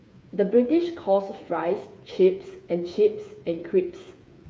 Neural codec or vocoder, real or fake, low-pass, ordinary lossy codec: codec, 16 kHz, 8 kbps, FreqCodec, smaller model; fake; none; none